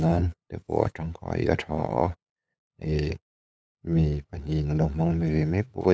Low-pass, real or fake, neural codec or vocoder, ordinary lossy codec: none; fake; codec, 16 kHz, 8 kbps, FunCodec, trained on LibriTTS, 25 frames a second; none